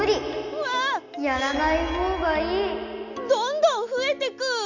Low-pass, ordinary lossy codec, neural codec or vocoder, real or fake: 7.2 kHz; none; none; real